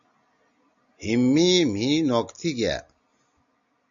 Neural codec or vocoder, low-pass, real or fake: none; 7.2 kHz; real